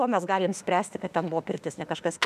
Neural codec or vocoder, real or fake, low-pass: autoencoder, 48 kHz, 32 numbers a frame, DAC-VAE, trained on Japanese speech; fake; 14.4 kHz